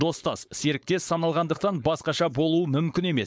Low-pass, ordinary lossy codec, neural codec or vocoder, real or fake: none; none; codec, 16 kHz, 4.8 kbps, FACodec; fake